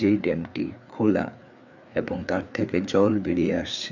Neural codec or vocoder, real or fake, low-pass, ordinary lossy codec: codec, 16 kHz, 4 kbps, FreqCodec, larger model; fake; 7.2 kHz; none